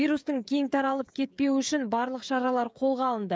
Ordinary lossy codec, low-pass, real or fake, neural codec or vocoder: none; none; fake; codec, 16 kHz, 16 kbps, FreqCodec, smaller model